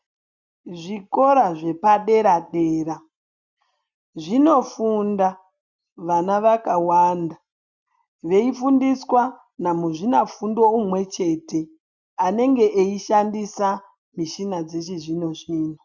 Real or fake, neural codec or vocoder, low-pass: real; none; 7.2 kHz